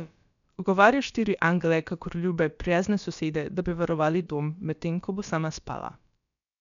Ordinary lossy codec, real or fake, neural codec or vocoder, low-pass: none; fake; codec, 16 kHz, about 1 kbps, DyCAST, with the encoder's durations; 7.2 kHz